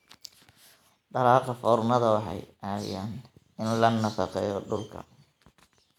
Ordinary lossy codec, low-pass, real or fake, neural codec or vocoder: none; 19.8 kHz; real; none